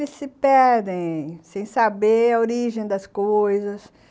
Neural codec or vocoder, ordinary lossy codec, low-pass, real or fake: none; none; none; real